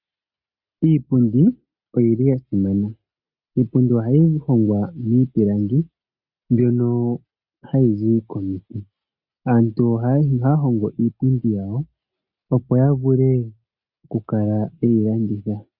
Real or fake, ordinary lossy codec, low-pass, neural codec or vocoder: real; Opus, 64 kbps; 5.4 kHz; none